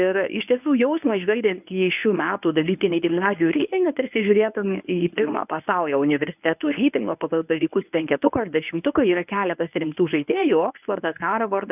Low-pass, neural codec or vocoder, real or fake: 3.6 kHz; codec, 24 kHz, 0.9 kbps, WavTokenizer, medium speech release version 1; fake